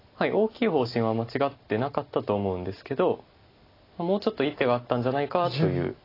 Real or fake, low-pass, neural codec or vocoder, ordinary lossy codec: real; 5.4 kHz; none; AAC, 24 kbps